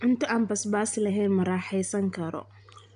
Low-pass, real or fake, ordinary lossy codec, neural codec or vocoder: 9.9 kHz; real; none; none